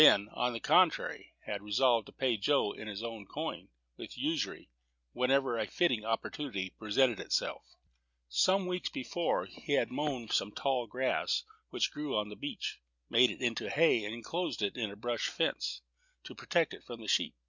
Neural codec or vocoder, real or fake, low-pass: none; real; 7.2 kHz